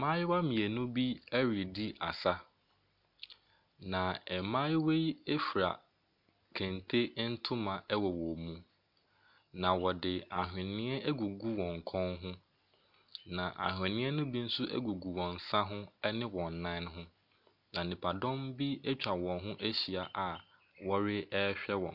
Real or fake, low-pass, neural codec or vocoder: real; 5.4 kHz; none